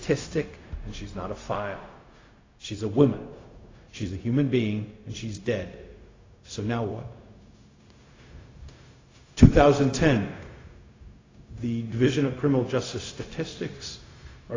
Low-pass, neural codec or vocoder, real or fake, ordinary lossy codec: 7.2 kHz; codec, 16 kHz, 0.4 kbps, LongCat-Audio-Codec; fake; AAC, 32 kbps